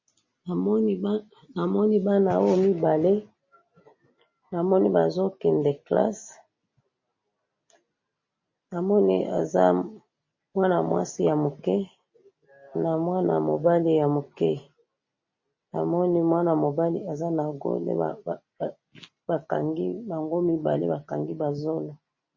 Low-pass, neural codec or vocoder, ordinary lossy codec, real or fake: 7.2 kHz; none; MP3, 32 kbps; real